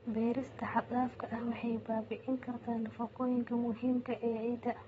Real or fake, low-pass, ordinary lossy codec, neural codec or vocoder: fake; 9.9 kHz; AAC, 24 kbps; vocoder, 22.05 kHz, 80 mel bands, WaveNeXt